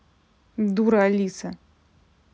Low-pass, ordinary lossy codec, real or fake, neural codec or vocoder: none; none; real; none